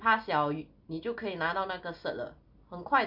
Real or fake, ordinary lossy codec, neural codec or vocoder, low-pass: real; none; none; 5.4 kHz